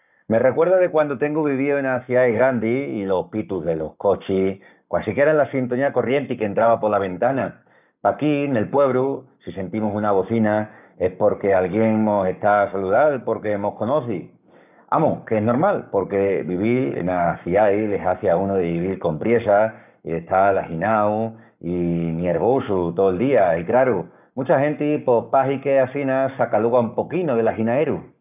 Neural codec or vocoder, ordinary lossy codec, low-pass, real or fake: codec, 44.1 kHz, 7.8 kbps, Pupu-Codec; none; 3.6 kHz; fake